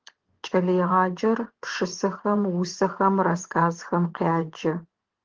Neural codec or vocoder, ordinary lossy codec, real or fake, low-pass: vocoder, 24 kHz, 100 mel bands, Vocos; Opus, 16 kbps; fake; 7.2 kHz